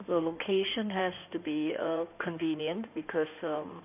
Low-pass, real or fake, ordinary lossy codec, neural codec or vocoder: 3.6 kHz; fake; none; codec, 16 kHz in and 24 kHz out, 2.2 kbps, FireRedTTS-2 codec